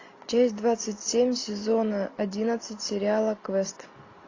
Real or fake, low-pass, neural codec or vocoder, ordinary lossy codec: real; 7.2 kHz; none; AAC, 32 kbps